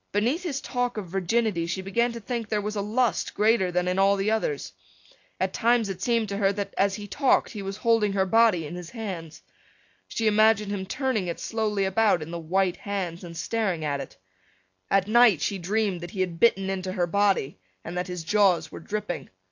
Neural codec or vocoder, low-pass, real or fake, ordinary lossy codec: none; 7.2 kHz; real; AAC, 48 kbps